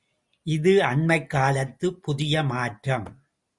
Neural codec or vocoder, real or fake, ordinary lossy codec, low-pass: none; real; Opus, 64 kbps; 10.8 kHz